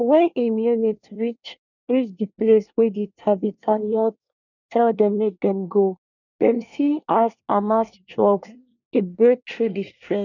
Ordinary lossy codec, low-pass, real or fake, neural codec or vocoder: none; 7.2 kHz; fake; codec, 24 kHz, 1 kbps, SNAC